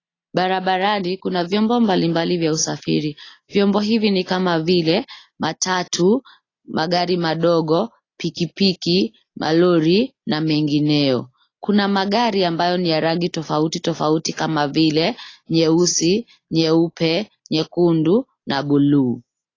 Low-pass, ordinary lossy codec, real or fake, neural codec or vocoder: 7.2 kHz; AAC, 32 kbps; real; none